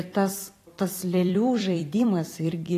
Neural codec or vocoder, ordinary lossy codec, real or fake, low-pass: vocoder, 44.1 kHz, 128 mel bands every 256 samples, BigVGAN v2; AAC, 48 kbps; fake; 14.4 kHz